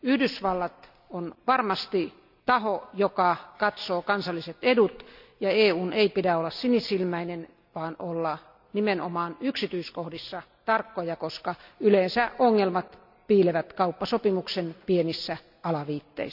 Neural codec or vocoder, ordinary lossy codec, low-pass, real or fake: none; none; 5.4 kHz; real